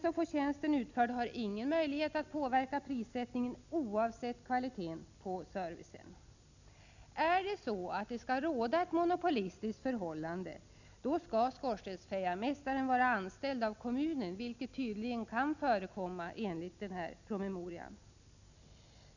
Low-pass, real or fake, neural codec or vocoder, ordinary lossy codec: 7.2 kHz; real; none; none